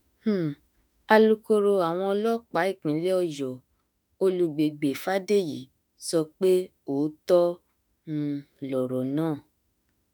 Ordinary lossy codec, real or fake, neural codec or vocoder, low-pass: none; fake; autoencoder, 48 kHz, 32 numbers a frame, DAC-VAE, trained on Japanese speech; none